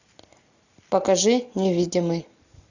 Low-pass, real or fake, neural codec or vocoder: 7.2 kHz; real; none